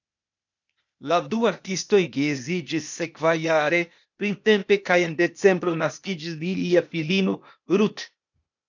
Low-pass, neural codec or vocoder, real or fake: 7.2 kHz; codec, 16 kHz, 0.8 kbps, ZipCodec; fake